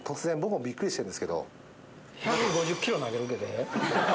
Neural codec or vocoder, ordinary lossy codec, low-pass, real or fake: none; none; none; real